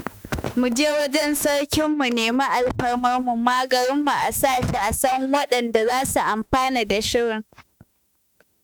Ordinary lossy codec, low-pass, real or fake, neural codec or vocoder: none; none; fake; autoencoder, 48 kHz, 32 numbers a frame, DAC-VAE, trained on Japanese speech